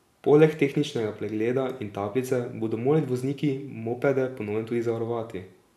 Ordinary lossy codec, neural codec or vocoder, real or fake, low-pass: none; none; real; 14.4 kHz